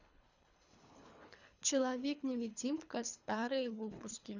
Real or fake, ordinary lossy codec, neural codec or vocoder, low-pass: fake; none; codec, 24 kHz, 3 kbps, HILCodec; 7.2 kHz